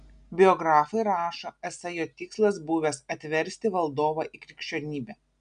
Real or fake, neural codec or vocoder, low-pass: real; none; 9.9 kHz